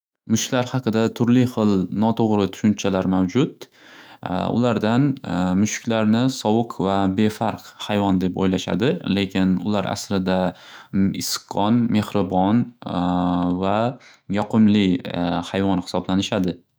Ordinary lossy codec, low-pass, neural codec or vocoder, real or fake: none; none; autoencoder, 48 kHz, 128 numbers a frame, DAC-VAE, trained on Japanese speech; fake